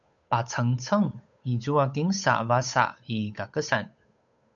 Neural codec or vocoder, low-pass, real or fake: codec, 16 kHz, 8 kbps, FunCodec, trained on Chinese and English, 25 frames a second; 7.2 kHz; fake